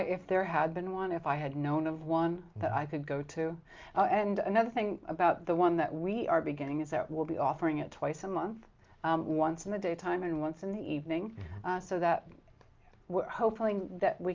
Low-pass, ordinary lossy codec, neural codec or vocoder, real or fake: 7.2 kHz; Opus, 32 kbps; none; real